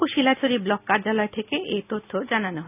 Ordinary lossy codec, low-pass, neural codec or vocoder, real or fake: none; 3.6 kHz; none; real